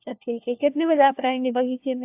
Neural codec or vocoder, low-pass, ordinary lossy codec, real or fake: codec, 16 kHz, 1 kbps, FunCodec, trained on LibriTTS, 50 frames a second; 3.6 kHz; AAC, 32 kbps; fake